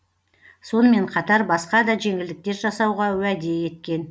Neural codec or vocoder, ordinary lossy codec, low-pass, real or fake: none; none; none; real